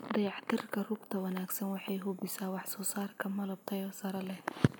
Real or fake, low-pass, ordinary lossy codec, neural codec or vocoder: real; none; none; none